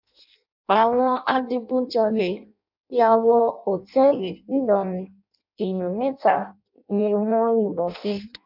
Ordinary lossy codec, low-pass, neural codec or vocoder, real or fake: MP3, 48 kbps; 5.4 kHz; codec, 16 kHz in and 24 kHz out, 0.6 kbps, FireRedTTS-2 codec; fake